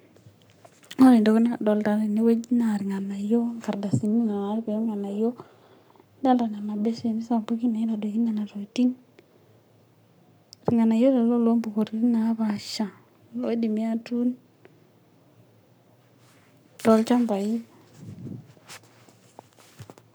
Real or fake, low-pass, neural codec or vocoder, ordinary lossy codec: fake; none; codec, 44.1 kHz, 7.8 kbps, Pupu-Codec; none